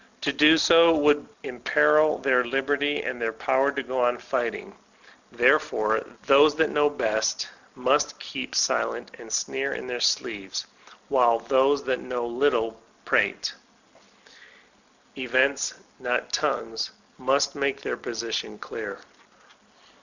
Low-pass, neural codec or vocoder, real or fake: 7.2 kHz; none; real